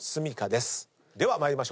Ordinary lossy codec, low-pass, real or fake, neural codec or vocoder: none; none; real; none